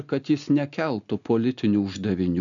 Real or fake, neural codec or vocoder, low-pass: real; none; 7.2 kHz